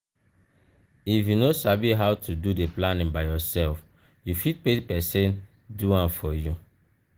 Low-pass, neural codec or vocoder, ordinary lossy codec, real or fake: 19.8 kHz; none; Opus, 16 kbps; real